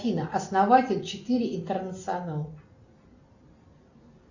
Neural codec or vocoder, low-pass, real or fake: none; 7.2 kHz; real